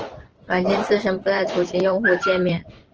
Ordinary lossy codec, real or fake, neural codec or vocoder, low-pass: Opus, 16 kbps; fake; vocoder, 44.1 kHz, 128 mel bands every 512 samples, BigVGAN v2; 7.2 kHz